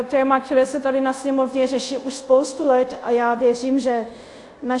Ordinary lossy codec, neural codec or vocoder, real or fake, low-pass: AAC, 64 kbps; codec, 24 kHz, 0.5 kbps, DualCodec; fake; 10.8 kHz